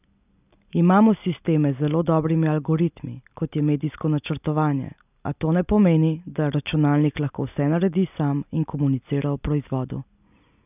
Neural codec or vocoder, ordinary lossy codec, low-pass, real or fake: none; none; 3.6 kHz; real